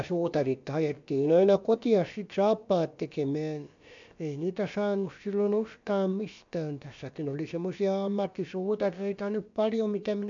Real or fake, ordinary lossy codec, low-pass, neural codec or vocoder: fake; MP3, 64 kbps; 7.2 kHz; codec, 16 kHz, about 1 kbps, DyCAST, with the encoder's durations